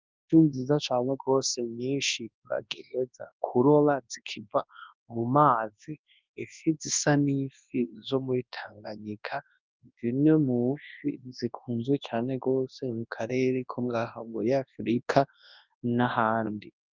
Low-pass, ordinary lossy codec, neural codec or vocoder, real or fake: 7.2 kHz; Opus, 24 kbps; codec, 24 kHz, 0.9 kbps, WavTokenizer, large speech release; fake